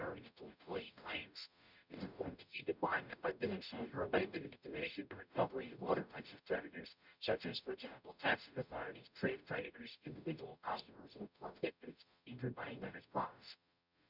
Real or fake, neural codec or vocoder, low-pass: fake; codec, 44.1 kHz, 0.9 kbps, DAC; 5.4 kHz